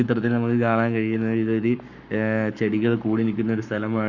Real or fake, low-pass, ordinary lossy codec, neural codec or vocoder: fake; 7.2 kHz; none; codec, 44.1 kHz, 7.8 kbps, Pupu-Codec